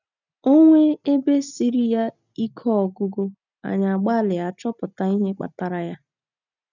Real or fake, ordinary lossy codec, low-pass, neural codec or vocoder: real; none; 7.2 kHz; none